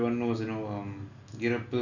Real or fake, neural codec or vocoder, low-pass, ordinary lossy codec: real; none; 7.2 kHz; none